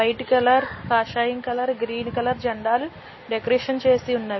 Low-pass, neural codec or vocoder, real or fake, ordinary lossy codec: 7.2 kHz; none; real; MP3, 24 kbps